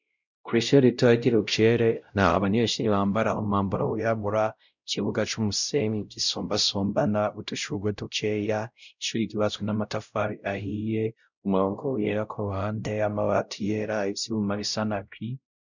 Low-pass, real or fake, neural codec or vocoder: 7.2 kHz; fake; codec, 16 kHz, 0.5 kbps, X-Codec, WavLM features, trained on Multilingual LibriSpeech